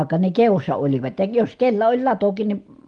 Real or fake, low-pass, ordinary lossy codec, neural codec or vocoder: real; 9.9 kHz; Opus, 24 kbps; none